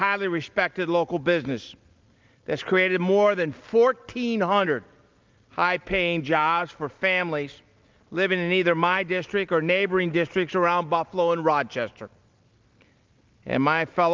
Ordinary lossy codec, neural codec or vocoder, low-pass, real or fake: Opus, 24 kbps; none; 7.2 kHz; real